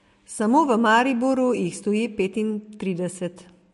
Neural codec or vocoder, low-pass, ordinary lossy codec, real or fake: none; 14.4 kHz; MP3, 48 kbps; real